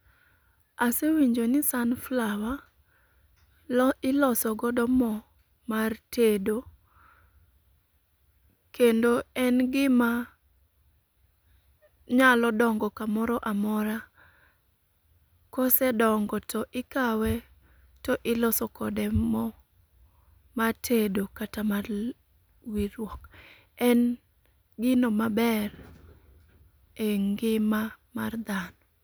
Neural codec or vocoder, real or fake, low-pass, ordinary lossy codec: none; real; none; none